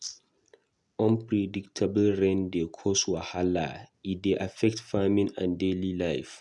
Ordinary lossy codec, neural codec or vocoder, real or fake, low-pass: none; none; real; 10.8 kHz